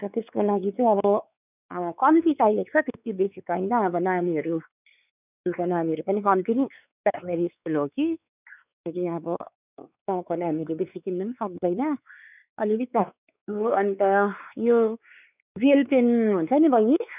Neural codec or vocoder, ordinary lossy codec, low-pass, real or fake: codec, 16 kHz, 4 kbps, X-Codec, WavLM features, trained on Multilingual LibriSpeech; none; 3.6 kHz; fake